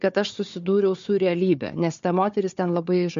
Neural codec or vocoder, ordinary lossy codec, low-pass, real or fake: codec, 16 kHz, 4 kbps, FunCodec, trained on Chinese and English, 50 frames a second; MP3, 48 kbps; 7.2 kHz; fake